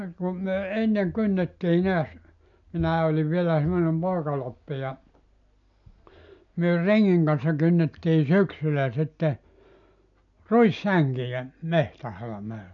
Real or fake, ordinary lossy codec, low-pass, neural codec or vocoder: real; none; 7.2 kHz; none